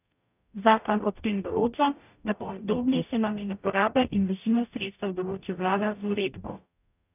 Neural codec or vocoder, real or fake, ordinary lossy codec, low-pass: codec, 44.1 kHz, 0.9 kbps, DAC; fake; none; 3.6 kHz